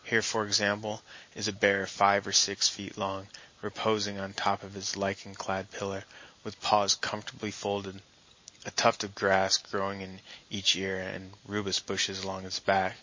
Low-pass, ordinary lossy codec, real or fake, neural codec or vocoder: 7.2 kHz; MP3, 32 kbps; real; none